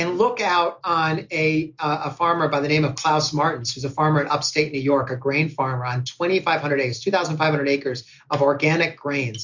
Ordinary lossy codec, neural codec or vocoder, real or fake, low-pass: MP3, 64 kbps; none; real; 7.2 kHz